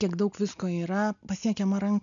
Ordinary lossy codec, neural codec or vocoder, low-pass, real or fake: MP3, 96 kbps; none; 7.2 kHz; real